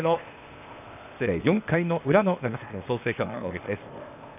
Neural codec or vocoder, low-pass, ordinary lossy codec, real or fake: codec, 16 kHz, 0.8 kbps, ZipCodec; 3.6 kHz; none; fake